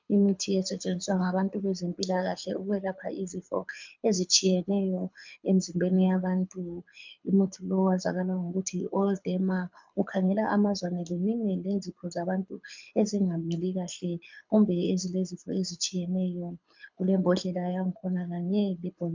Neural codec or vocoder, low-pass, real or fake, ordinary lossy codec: codec, 24 kHz, 6 kbps, HILCodec; 7.2 kHz; fake; MP3, 64 kbps